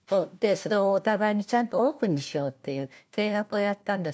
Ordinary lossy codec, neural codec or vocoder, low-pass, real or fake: none; codec, 16 kHz, 1 kbps, FunCodec, trained on LibriTTS, 50 frames a second; none; fake